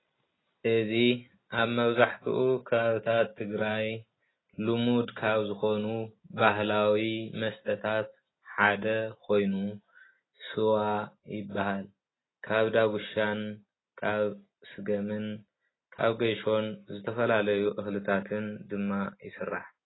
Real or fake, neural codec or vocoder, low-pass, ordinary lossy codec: real; none; 7.2 kHz; AAC, 16 kbps